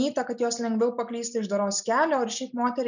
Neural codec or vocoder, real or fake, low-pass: none; real; 7.2 kHz